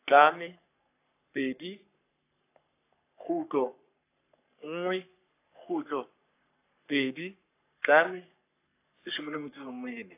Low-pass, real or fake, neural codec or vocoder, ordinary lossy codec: 3.6 kHz; fake; codec, 44.1 kHz, 3.4 kbps, Pupu-Codec; AAC, 24 kbps